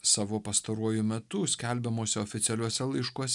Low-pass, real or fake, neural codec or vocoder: 10.8 kHz; real; none